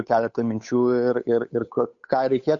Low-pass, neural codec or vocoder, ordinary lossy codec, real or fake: 7.2 kHz; codec, 16 kHz, 8 kbps, FunCodec, trained on Chinese and English, 25 frames a second; MP3, 48 kbps; fake